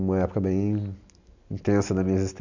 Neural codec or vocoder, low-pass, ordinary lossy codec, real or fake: none; 7.2 kHz; none; real